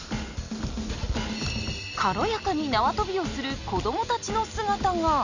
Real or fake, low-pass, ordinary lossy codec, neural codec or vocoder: real; 7.2 kHz; none; none